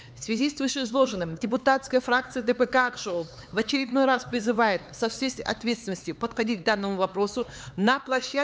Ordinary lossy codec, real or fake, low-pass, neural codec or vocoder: none; fake; none; codec, 16 kHz, 4 kbps, X-Codec, HuBERT features, trained on LibriSpeech